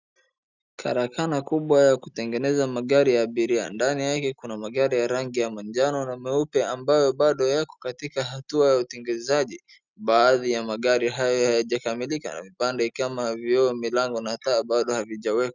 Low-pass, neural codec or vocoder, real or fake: 7.2 kHz; none; real